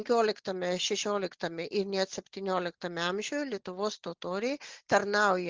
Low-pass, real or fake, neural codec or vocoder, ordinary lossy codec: 7.2 kHz; real; none; Opus, 16 kbps